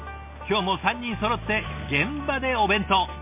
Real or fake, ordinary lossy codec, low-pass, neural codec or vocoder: real; MP3, 32 kbps; 3.6 kHz; none